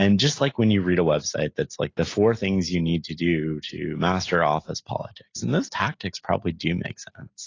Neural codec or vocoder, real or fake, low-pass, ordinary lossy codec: none; real; 7.2 kHz; AAC, 32 kbps